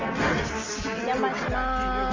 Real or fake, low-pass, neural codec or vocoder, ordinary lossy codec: real; 7.2 kHz; none; Opus, 32 kbps